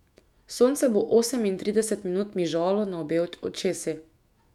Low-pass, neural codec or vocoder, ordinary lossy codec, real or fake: 19.8 kHz; autoencoder, 48 kHz, 128 numbers a frame, DAC-VAE, trained on Japanese speech; none; fake